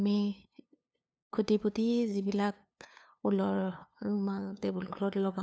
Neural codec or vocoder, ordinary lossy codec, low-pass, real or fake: codec, 16 kHz, 2 kbps, FunCodec, trained on LibriTTS, 25 frames a second; none; none; fake